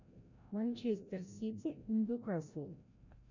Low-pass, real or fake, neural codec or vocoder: 7.2 kHz; fake; codec, 16 kHz, 0.5 kbps, FreqCodec, larger model